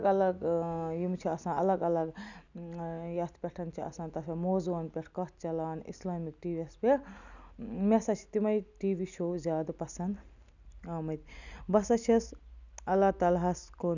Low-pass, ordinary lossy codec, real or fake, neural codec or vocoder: 7.2 kHz; none; real; none